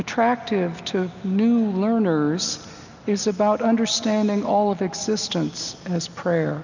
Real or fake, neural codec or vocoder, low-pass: real; none; 7.2 kHz